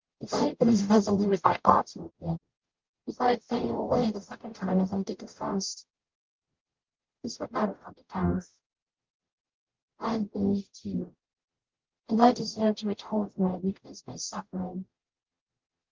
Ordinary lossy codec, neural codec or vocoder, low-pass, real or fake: Opus, 32 kbps; codec, 44.1 kHz, 0.9 kbps, DAC; 7.2 kHz; fake